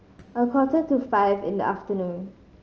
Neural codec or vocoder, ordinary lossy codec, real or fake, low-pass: none; Opus, 24 kbps; real; 7.2 kHz